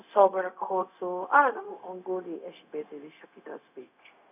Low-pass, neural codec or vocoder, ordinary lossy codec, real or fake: 3.6 kHz; codec, 16 kHz, 0.4 kbps, LongCat-Audio-Codec; none; fake